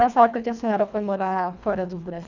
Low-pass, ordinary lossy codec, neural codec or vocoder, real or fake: 7.2 kHz; none; codec, 24 kHz, 1.5 kbps, HILCodec; fake